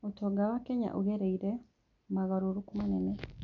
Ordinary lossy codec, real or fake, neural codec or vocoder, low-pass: none; real; none; 7.2 kHz